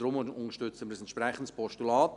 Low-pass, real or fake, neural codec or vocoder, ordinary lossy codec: 10.8 kHz; real; none; none